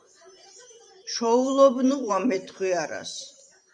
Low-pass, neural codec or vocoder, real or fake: 9.9 kHz; vocoder, 44.1 kHz, 128 mel bands every 512 samples, BigVGAN v2; fake